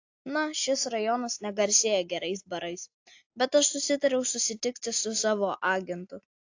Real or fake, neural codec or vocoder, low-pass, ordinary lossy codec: real; none; 7.2 kHz; AAC, 48 kbps